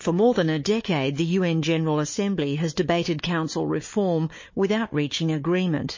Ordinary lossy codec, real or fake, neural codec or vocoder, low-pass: MP3, 32 kbps; fake; codec, 16 kHz, 4 kbps, FunCodec, trained on Chinese and English, 50 frames a second; 7.2 kHz